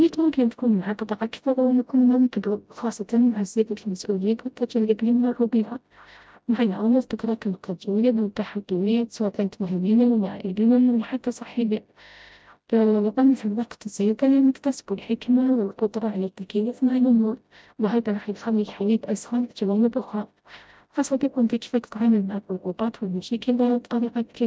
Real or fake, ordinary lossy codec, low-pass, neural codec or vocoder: fake; none; none; codec, 16 kHz, 0.5 kbps, FreqCodec, smaller model